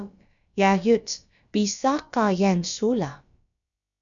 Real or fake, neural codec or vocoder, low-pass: fake; codec, 16 kHz, about 1 kbps, DyCAST, with the encoder's durations; 7.2 kHz